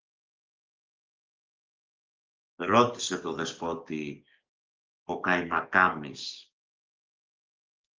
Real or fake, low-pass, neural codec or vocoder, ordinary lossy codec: fake; 7.2 kHz; codec, 44.1 kHz, 7.8 kbps, DAC; Opus, 24 kbps